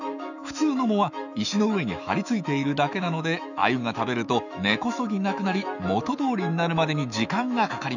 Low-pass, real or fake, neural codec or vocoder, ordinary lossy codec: 7.2 kHz; fake; autoencoder, 48 kHz, 128 numbers a frame, DAC-VAE, trained on Japanese speech; none